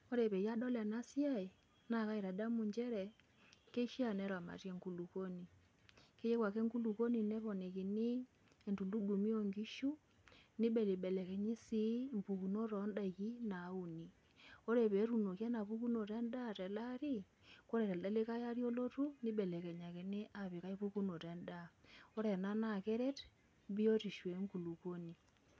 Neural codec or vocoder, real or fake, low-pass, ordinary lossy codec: none; real; none; none